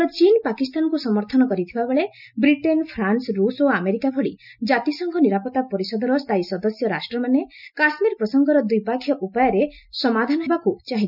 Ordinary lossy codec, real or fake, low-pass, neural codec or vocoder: none; real; 5.4 kHz; none